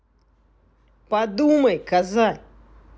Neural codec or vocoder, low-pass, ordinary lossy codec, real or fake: none; none; none; real